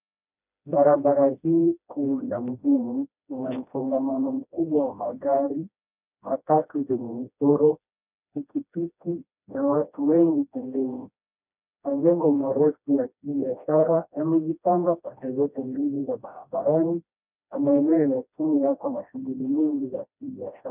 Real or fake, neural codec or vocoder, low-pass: fake; codec, 16 kHz, 1 kbps, FreqCodec, smaller model; 3.6 kHz